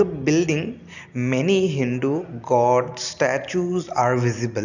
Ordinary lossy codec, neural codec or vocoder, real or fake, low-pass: none; none; real; 7.2 kHz